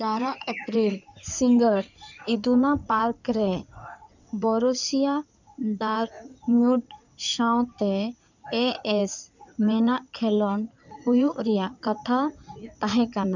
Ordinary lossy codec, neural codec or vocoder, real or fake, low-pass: none; codec, 16 kHz in and 24 kHz out, 2.2 kbps, FireRedTTS-2 codec; fake; 7.2 kHz